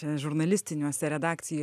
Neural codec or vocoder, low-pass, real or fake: none; 14.4 kHz; real